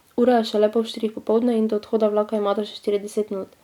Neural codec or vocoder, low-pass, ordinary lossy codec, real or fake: none; 19.8 kHz; none; real